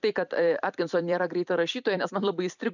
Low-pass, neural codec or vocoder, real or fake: 7.2 kHz; vocoder, 44.1 kHz, 128 mel bands every 256 samples, BigVGAN v2; fake